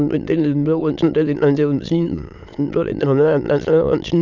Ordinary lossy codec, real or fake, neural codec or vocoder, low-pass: none; fake; autoencoder, 22.05 kHz, a latent of 192 numbers a frame, VITS, trained on many speakers; 7.2 kHz